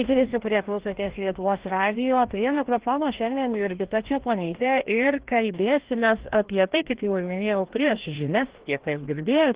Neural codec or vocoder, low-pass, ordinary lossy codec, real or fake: codec, 16 kHz, 1 kbps, FreqCodec, larger model; 3.6 kHz; Opus, 16 kbps; fake